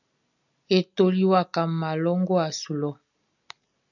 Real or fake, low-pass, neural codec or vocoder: real; 7.2 kHz; none